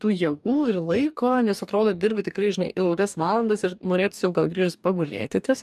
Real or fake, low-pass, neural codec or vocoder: fake; 14.4 kHz; codec, 44.1 kHz, 2.6 kbps, DAC